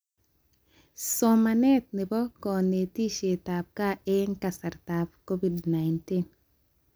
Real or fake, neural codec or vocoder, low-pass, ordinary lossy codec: real; none; none; none